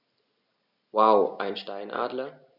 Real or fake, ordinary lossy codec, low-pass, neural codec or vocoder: real; none; 5.4 kHz; none